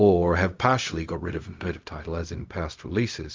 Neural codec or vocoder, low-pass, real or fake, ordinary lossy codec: codec, 16 kHz, 0.4 kbps, LongCat-Audio-Codec; 7.2 kHz; fake; Opus, 32 kbps